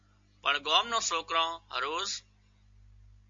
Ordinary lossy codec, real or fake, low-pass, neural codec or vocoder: MP3, 48 kbps; real; 7.2 kHz; none